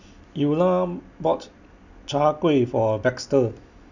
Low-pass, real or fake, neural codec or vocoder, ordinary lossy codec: 7.2 kHz; real; none; none